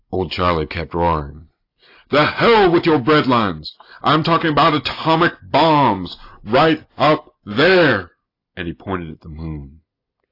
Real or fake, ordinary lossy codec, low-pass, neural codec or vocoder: real; AAC, 32 kbps; 5.4 kHz; none